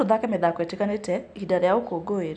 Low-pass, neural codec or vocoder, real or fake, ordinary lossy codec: 9.9 kHz; none; real; none